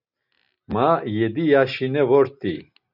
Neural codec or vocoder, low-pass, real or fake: none; 5.4 kHz; real